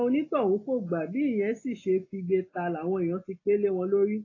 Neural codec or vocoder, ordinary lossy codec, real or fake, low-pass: none; AAC, 32 kbps; real; 7.2 kHz